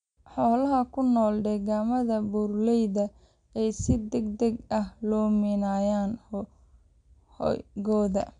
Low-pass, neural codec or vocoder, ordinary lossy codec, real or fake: 9.9 kHz; none; none; real